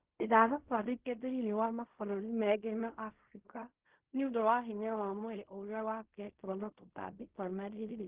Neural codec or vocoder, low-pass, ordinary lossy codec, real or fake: codec, 16 kHz in and 24 kHz out, 0.4 kbps, LongCat-Audio-Codec, fine tuned four codebook decoder; 3.6 kHz; Opus, 16 kbps; fake